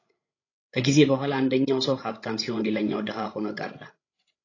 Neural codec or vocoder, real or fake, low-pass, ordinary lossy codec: codec, 16 kHz, 16 kbps, FreqCodec, larger model; fake; 7.2 kHz; AAC, 32 kbps